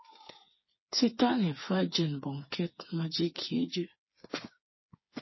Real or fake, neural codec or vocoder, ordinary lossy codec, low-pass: fake; codec, 16 kHz, 4 kbps, FreqCodec, smaller model; MP3, 24 kbps; 7.2 kHz